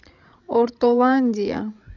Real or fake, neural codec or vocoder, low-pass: fake; codec, 16 kHz, 16 kbps, FreqCodec, larger model; 7.2 kHz